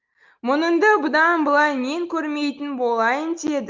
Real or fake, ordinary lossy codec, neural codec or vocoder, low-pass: real; Opus, 24 kbps; none; 7.2 kHz